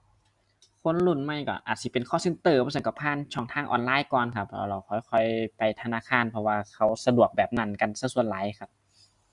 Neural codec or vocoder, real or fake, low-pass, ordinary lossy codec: none; real; 10.8 kHz; Opus, 64 kbps